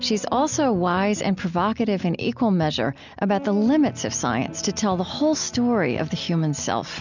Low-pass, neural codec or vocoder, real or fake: 7.2 kHz; none; real